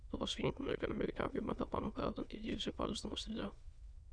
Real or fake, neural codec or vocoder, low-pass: fake; autoencoder, 22.05 kHz, a latent of 192 numbers a frame, VITS, trained on many speakers; 9.9 kHz